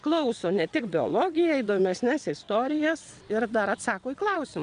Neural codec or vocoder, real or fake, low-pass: vocoder, 22.05 kHz, 80 mel bands, WaveNeXt; fake; 9.9 kHz